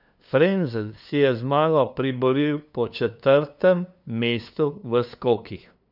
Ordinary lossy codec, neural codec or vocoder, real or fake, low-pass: none; codec, 16 kHz, 2 kbps, FunCodec, trained on LibriTTS, 25 frames a second; fake; 5.4 kHz